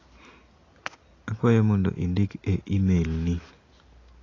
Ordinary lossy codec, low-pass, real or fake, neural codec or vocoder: AAC, 32 kbps; 7.2 kHz; real; none